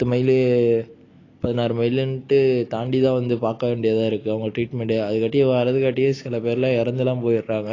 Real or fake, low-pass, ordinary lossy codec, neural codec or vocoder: real; 7.2 kHz; AAC, 32 kbps; none